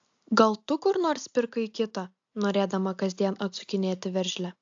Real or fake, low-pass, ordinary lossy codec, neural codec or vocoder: real; 7.2 kHz; AAC, 64 kbps; none